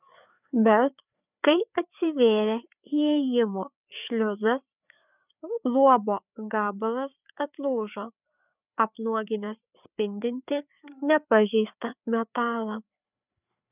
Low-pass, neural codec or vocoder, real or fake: 3.6 kHz; codec, 16 kHz, 4 kbps, FreqCodec, larger model; fake